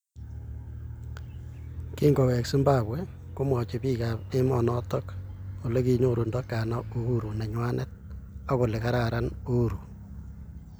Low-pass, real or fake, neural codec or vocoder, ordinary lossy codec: none; real; none; none